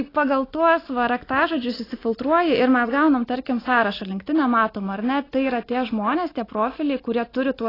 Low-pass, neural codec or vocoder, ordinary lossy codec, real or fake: 5.4 kHz; none; AAC, 24 kbps; real